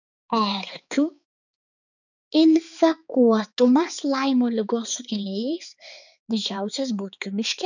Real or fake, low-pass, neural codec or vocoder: fake; 7.2 kHz; codec, 16 kHz, 4 kbps, X-Codec, HuBERT features, trained on balanced general audio